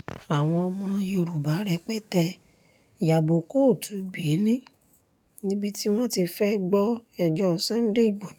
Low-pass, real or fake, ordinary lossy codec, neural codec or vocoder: 19.8 kHz; fake; none; codec, 44.1 kHz, 7.8 kbps, DAC